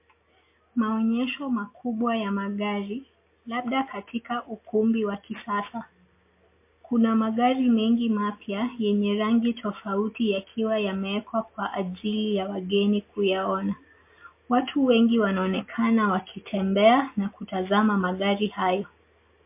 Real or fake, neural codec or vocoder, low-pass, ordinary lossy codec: real; none; 3.6 kHz; MP3, 24 kbps